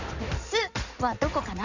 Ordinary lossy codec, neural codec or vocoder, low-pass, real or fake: none; codec, 16 kHz in and 24 kHz out, 2.2 kbps, FireRedTTS-2 codec; 7.2 kHz; fake